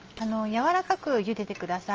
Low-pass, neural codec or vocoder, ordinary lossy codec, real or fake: 7.2 kHz; none; Opus, 24 kbps; real